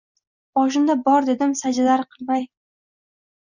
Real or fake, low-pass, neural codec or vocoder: real; 7.2 kHz; none